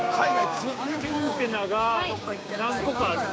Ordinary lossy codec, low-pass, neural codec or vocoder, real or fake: none; none; codec, 16 kHz, 6 kbps, DAC; fake